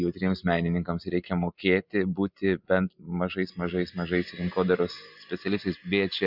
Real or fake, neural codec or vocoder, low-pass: real; none; 5.4 kHz